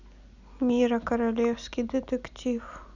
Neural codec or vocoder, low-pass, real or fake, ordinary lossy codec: none; 7.2 kHz; real; none